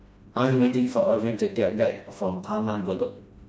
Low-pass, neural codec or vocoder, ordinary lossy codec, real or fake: none; codec, 16 kHz, 1 kbps, FreqCodec, smaller model; none; fake